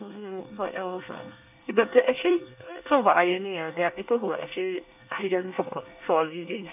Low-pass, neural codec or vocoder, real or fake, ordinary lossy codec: 3.6 kHz; codec, 24 kHz, 1 kbps, SNAC; fake; none